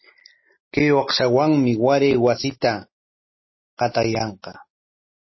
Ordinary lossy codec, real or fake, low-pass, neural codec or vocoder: MP3, 24 kbps; real; 7.2 kHz; none